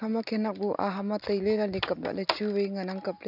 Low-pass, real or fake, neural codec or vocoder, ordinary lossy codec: 5.4 kHz; real; none; none